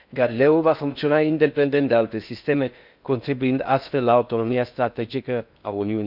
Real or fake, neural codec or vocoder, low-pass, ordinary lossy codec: fake; codec, 16 kHz in and 24 kHz out, 0.6 kbps, FocalCodec, streaming, 4096 codes; 5.4 kHz; none